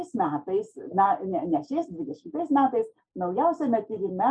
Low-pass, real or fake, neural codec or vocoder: 9.9 kHz; real; none